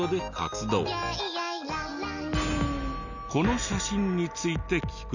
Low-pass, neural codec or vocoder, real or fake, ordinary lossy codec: 7.2 kHz; none; real; none